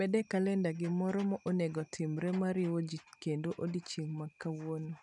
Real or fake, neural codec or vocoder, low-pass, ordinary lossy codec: real; none; 10.8 kHz; none